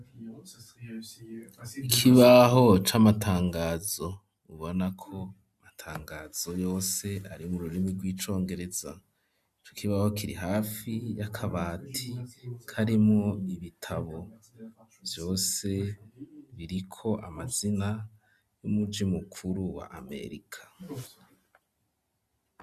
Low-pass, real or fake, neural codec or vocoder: 14.4 kHz; real; none